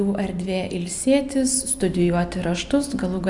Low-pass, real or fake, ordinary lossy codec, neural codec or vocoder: 10.8 kHz; real; MP3, 96 kbps; none